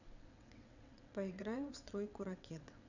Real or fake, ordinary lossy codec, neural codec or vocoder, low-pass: fake; none; vocoder, 22.05 kHz, 80 mel bands, WaveNeXt; 7.2 kHz